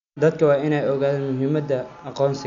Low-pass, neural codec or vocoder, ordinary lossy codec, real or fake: 7.2 kHz; none; none; real